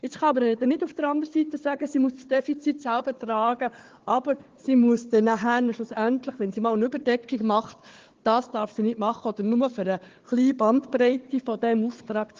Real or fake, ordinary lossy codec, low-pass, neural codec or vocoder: fake; Opus, 16 kbps; 7.2 kHz; codec, 16 kHz, 4 kbps, FunCodec, trained on Chinese and English, 50 frames a second